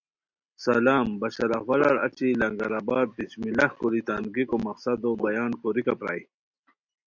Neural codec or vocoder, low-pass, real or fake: none; 7.2 kHz; real